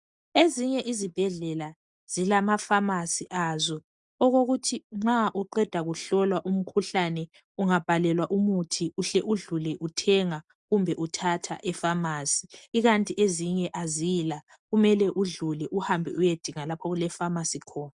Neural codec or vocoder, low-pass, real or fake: none; 10.8 kHz; real